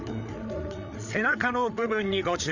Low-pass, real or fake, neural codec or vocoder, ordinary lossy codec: 7.2 kHz; fake; codec, 16 kHz, 8 kbps, FreqCodec, larger model; none